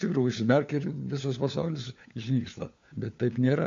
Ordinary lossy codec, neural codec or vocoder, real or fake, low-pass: MP3, 48 kbps; codec, 16 kHz, 4 kbps, FunCodec, trained on LibriTTS, 50 frames a second; fake; 7.2 kHz